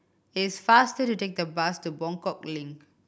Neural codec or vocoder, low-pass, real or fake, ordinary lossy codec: none; none; real; none